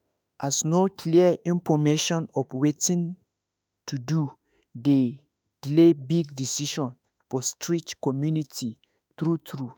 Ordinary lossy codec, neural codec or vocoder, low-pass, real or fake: none; autoencoder, 48 kHz, 32 numbers a frame, DAC-VAE, trained on Japanese speech; none; fake